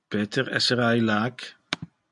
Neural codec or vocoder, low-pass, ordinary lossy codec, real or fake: none; 10.8 kHz; MP3, 64 kbps; real